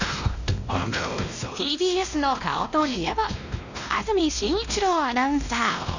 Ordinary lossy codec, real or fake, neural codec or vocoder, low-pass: none; fake; codec, 16 kHz, 1 kbps, X-Codec, WavLM features, trained on Multilingual LibriSpeech; 7.2 kHz